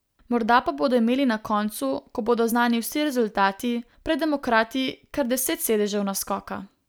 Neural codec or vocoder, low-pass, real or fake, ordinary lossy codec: none; none; real; none